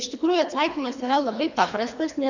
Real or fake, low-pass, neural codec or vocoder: fake; 7.2 kHz; codec, 24 kHz, 3 kbps, HILCodec